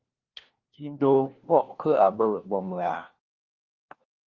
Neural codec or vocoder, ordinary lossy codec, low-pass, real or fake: codec, 16 kHz, 1 kbps, FunCodec, trained on LibriTTS, 50 frames a second; Opus, 16 kbps; 7.2 kHz; fake